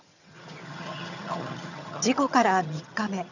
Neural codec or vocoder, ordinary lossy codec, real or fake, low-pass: vocoder, 22.05 kHz, 80 mel bands, HiFi-GAN; none; fake; 7.2 kHz